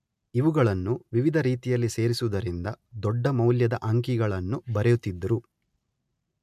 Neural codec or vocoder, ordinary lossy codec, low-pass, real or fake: none; MP3, 96 kbps; 14.4 kHz; real